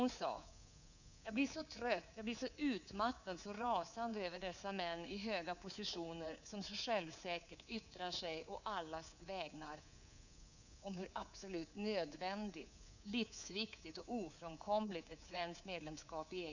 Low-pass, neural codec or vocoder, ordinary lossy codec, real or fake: 7.2 kHz; codec, 24 kHz, 3.1 kbps, DualCodec; none; fake